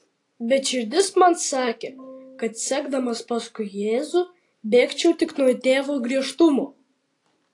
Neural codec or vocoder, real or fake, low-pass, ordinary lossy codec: none; real; 10.8 kHz; AAC, 48 kbps